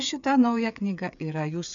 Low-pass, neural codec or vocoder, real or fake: 7.2 kHz; codec, 16 kHz, 8 kbps, FreqCodec, smaller model; fake